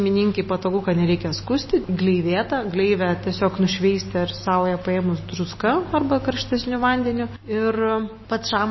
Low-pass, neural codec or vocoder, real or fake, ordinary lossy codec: 7.2 kHz; none; real; MP3, 24 kbps